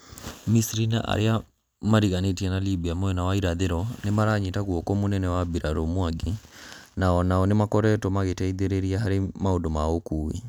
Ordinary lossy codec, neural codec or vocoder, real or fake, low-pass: none; none; real; none